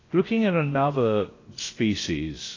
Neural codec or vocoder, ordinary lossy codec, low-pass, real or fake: codec, 16 kHz, about 1 kbps, DyCAST, with the encoder's durations; AAC, 32 kbps; 7.2 kHz; fake